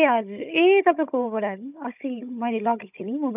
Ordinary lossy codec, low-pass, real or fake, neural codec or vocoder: none; 3.6 kHz; fake; codec, 16 kHz, 16 kbps, FunCodec, trained on Chinese and English, 50 frames a second